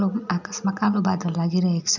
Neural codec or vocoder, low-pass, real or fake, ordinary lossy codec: none; 7.2 kHz; real; none